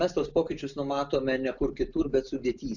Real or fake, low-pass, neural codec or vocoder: real; 7.2 kHz; none